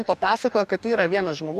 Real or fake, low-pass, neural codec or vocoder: fake; 14.4 kHz; codec, 44.1 kHz, 2.6 kbps, DAC